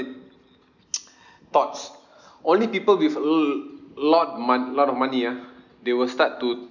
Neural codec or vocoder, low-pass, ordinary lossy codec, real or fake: none; 7.2 kHz; none; real